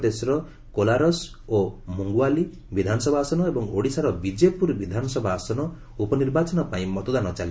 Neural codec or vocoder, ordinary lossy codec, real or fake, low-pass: none; none; real; none